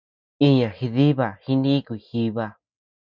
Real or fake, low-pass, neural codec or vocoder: real; 7.2 kHz; none